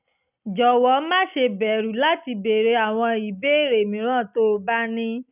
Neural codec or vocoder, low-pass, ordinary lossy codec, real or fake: none; 3.6 kHz; none; real